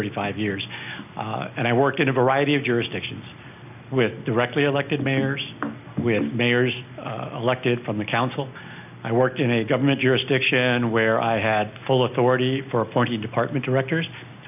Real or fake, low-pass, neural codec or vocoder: real; 3.6 kHz; none